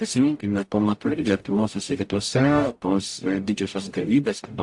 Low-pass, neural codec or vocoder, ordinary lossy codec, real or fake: 10.8 kHz; codec, 44.1 kHz, 0.9 kbps, DAC; AAC, 64 kbps; fake